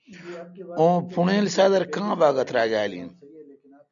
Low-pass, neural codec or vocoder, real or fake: 7.2 kHz; none; real